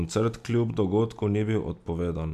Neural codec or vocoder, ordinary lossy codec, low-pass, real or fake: none; none; 14.4 kHz; real